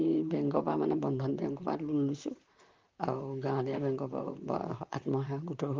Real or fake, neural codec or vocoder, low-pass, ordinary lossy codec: fake; vocoder, 44.1 kHz, 128 mel bands, Pupu-Vocoder; 7.2 kHz; Opus, 32 kbps